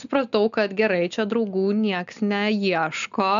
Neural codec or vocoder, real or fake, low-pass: none; real; 7.2 kHz